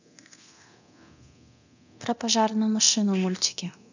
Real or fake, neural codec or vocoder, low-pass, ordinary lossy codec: fake; codec, 24 kHz, 0.9 kbps, DualCodec; 7.2 kHz; none